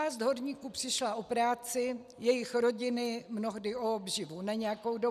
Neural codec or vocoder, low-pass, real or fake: none; 14.4 kHz; real